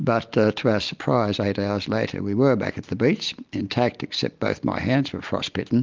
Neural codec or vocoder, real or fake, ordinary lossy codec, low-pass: none; real; Opus, 32 kbps; 7.2 kHz